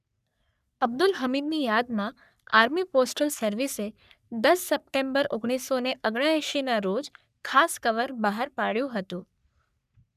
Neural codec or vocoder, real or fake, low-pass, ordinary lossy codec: codec, 44.1 kHz, 3.4 kbps, Pupu-Codec; fake; 14.4 kHz; none